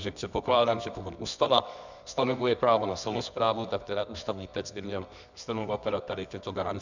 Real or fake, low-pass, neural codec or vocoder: fake; 7.2 kHz; codec, 24 kHz, 0.9 kbps, WavTokenizer, medium music audio release